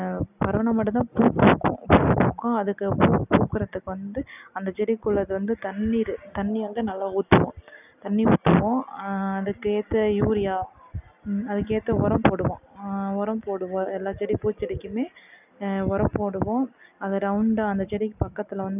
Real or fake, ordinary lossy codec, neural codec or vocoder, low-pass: real; none; none; 3.6 kHz